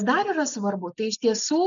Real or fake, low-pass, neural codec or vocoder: real; 7.2 kHz; none